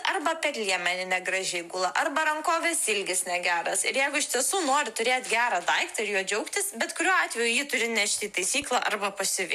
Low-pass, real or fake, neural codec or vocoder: 14.4 kHz; real; none